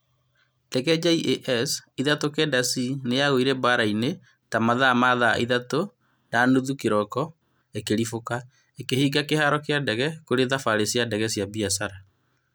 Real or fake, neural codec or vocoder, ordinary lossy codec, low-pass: real; none; none; none